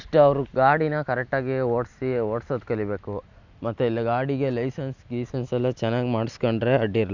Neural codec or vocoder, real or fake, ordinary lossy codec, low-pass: none; real; none; 7.2 kHz